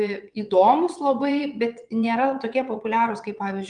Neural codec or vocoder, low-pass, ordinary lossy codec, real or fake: vocoder, 22.05 kHz, 80 mel bands, WaveNeXt; 9.9 kHz; Opus, 32 kbps; fake